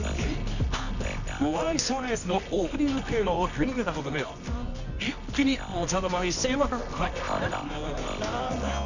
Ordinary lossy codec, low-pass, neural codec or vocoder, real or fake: none; 7.2 kHz; codec, 24 kHz, 0.9 kbps, WavTokenizer, medium music audio release; fake